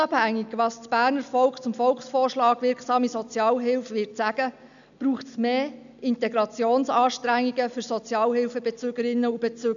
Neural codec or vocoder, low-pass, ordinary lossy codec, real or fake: none; 7.2 kHz; none; real